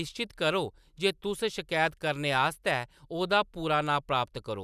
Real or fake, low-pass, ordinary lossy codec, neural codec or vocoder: real; 14.4 kHz; none; none